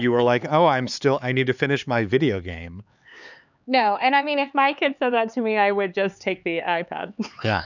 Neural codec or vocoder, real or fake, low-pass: codec, 16 kHz, 4 kbps, X-Codec, HuBERT features, trained on LibriSpeech; fake; 7.2 kHz